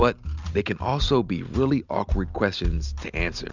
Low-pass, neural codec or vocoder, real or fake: 7.2 kHz; none; real